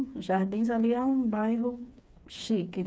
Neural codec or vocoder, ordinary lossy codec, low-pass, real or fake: codec, 16 kHz, 4 kbps, FreqCodec, smaller model; none; none; fake